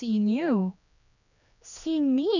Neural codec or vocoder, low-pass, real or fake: codec, 16 kHz, 2 kbps, X-Codec, HuBERT features, trained on general audio; 7.2 kHz; fake